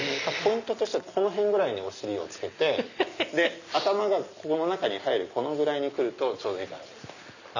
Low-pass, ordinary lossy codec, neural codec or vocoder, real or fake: 7.2 kHz; none; none; real